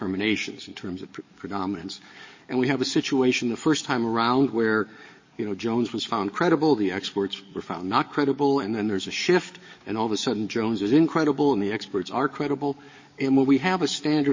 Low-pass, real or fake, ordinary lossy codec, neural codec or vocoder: 7.2 kHz; fake; MP3, 32 kbps; codec, 44.1 kHz, 7.8 kbps, DAC